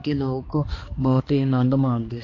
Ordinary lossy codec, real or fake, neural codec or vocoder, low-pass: AAC, 32 kbps; fake; codec, 16 kHz, 2 kbps, X-Codec, HuBERT features, trained on balanced general audio; 7.2 kHz